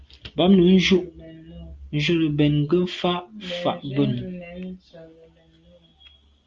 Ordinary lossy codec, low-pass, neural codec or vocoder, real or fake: Opus, 32 kbps; 7.2 kHz; none; real